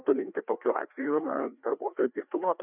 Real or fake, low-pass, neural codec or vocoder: fake; 3.6 kHz; codec, 16 kHz, 2 kbps, FreqCodec, larger model